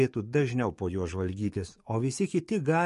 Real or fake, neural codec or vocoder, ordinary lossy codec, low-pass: fake; codec, 44.1 kHz, 7.8 kbps, DAC; MP3, 48 kbps; 14.4 kHz